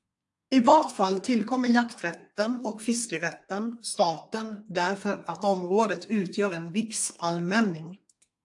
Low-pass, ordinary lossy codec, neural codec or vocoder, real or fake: 10.8 kHz; AAC, 64 kbps; codec, 24 kHz, 1 kbps, SNAC; fake